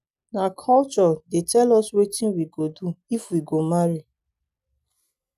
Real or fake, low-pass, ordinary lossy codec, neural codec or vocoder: real; 14.4 kHz; none; none